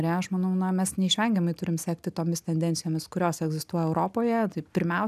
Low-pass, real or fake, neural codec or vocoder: 14.4 kHz; real; none